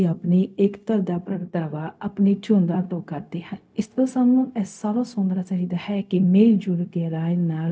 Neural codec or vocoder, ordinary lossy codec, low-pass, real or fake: codec, 16 kHz, 0.4 kbps, LongCat-Audio-Codec; none; none; fake